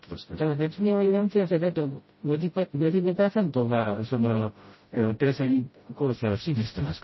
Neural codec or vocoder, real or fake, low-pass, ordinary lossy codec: codec, 16 kHz, 0.5 kbps, FreqCodec, smaller model; fake; 7.2 kHz; MP3, 24 kbps